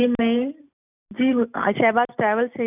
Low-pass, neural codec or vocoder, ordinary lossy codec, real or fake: 3.6 kHz; none; none; real